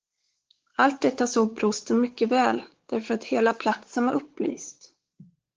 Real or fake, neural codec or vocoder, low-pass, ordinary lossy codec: fake; codec, 16 kHz, 4 kbps, X-Codec, WavLM features, trained on Multilingual LibriSpeech; 7.2 kHz; Opus, 16 kbps